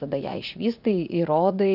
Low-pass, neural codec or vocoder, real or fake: 5.4 kHz; vocoder, 44.1 kHz, 80 mel bands, Vocos; fake